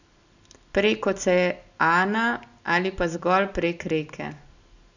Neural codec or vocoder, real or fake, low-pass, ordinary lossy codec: none; real; 7.2 kHz; none